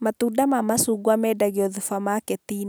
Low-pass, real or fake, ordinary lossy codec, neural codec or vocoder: none; real; none; none